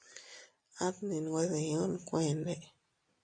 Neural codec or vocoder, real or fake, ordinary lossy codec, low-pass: none; real; MP3, 48 kbps; 9.9 kHz